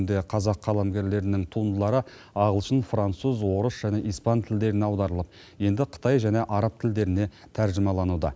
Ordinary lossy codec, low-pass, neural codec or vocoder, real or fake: none; none; none; real